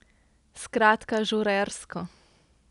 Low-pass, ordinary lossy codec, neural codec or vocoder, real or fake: 10.8 kHz; none; none; real